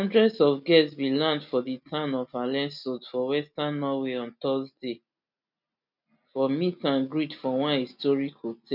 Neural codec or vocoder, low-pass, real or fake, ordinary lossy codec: none; 5.4 kHz; real; none